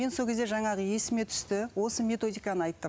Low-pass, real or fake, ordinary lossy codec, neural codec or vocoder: none; real; none; none